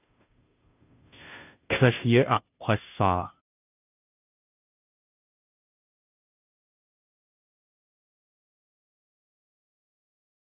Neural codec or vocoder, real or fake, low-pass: codec, 16 kHz, 0.5 kbps, FunCodec, trained on Chinese and English, 25 frames a second; fake; 3.6 kHz